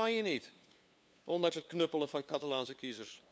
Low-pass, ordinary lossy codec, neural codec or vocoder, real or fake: none; none; codec, 16 kHz, 2 kbps, FunCodec, trained on LibriTTS, 25 frames a second; fake